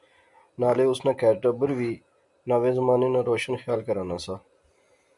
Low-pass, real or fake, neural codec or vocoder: 10.8 kHz; real; none